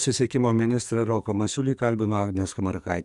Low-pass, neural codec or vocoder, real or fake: 10.8 kHz; codec, 44.1 kHz, 2.6 kbps, SNAC; fake